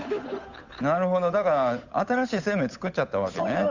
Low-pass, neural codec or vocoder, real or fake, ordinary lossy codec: 7.2 kHz; codec, 16 kHz, 8 kbps, FunCodec, trained on Chinese and English, 25 frames a second; fake; Opus, 64 kbps